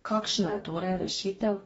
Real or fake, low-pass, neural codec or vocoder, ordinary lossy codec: fake; 19.8 kHz; codec, 44.1 kHz, 2.6 kbps, DAC; AAC, 24 kbps